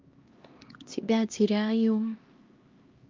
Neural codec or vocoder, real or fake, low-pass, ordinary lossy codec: codec, 16 kHz, 1 kbps, X-Codec, HuBERT features, trained on LibriSpeech; fake; 7.2 kHz; Opus, 24 kbps